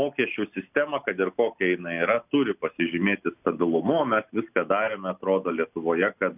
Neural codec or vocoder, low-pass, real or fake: none; 3.6 kHz; real